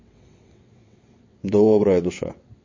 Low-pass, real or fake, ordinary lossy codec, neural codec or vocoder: 7.2 kHz; fake; MP3, 32 kbps; vocoder, 22.05 kHz, 80 mel bands, WaveNeXt